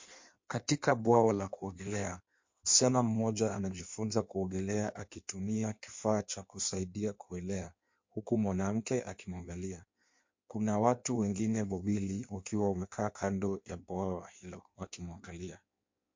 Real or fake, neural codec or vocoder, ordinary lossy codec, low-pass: fake; codec, 16 kHz in and 24 kHz out, 1.1 kbps, FireRedTTS-2 codec; MP3, 48 kbps; 7.2 kHz